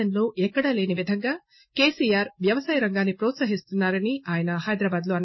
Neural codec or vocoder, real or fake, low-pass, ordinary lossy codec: none; real; 7.2 kHz; MP3, 24 kbps